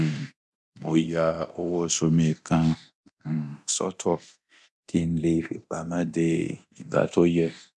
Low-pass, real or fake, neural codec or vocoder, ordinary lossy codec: none; fake; codec, 24 kHz, 0.9 kbps, DualCodec; none